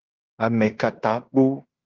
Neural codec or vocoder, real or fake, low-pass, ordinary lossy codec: codec, 16 kHz in and 24 kHz out, 0.9 kbps, LongCat-Audio-Codec, fine tuned four codebook decoder; fake; 7.2 kHz; Opus, 32 kbps